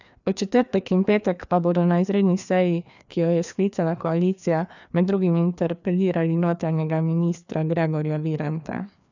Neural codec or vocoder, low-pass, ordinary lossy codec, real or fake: codec, 16 kHz, 2 kbps, FreqCodec, larger model; 7.2 kHz; none; fake